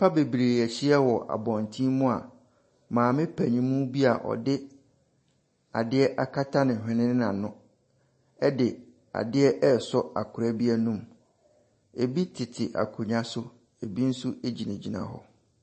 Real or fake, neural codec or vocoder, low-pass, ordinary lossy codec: real; none; 9.9 kHz; MP3, 32 kbps